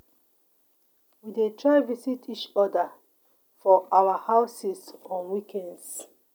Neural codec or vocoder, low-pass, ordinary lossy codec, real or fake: none; none; none; real